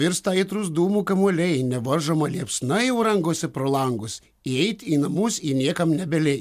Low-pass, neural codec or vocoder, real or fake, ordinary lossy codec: 14.4 kHz; none; real; MP3, 96 kbps